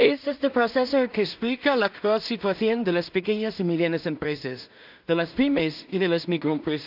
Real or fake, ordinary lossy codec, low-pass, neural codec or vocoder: fake; AAC, 48 kbps; 5.4 kHz; codec, 16 kHz in and 24 kHz out, 0.4 kbps, LongCat-Audio-Codec, two codebook decoder